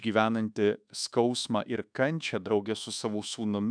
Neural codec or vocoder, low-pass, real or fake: codec, 24 kHz, 1.2 kbps, DualCodec; 9.9 kHz; fake